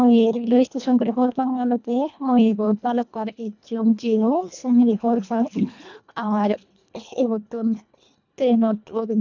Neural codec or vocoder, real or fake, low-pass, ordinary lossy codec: codec, 24 kHz, 1.5 kbps, HILCodec; fake; 7.2 kHz; none